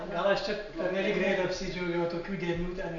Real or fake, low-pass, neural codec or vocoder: real; 7.2 kHz; none